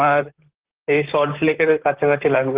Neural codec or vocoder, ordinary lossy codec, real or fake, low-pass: vocoder, 44.1 kHz, 128 mel bands, Pupu-Vocoder; Opus, 16 kbps; fake; 3.6 kHz